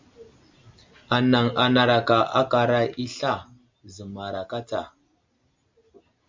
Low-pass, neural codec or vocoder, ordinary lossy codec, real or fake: 7.2 kHz; none; MP3, 64 kbps; real